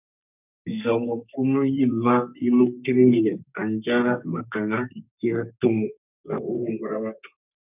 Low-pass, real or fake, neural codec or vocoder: 3.6 kHz; fake; codec, 32 kHz, 1.9 kbps, SNAC